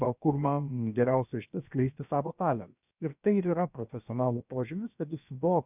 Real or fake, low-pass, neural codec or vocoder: fake; 3.6 kHz; codec, 16 kHz, about 1 kbps, DyCAST, with the encoder's durations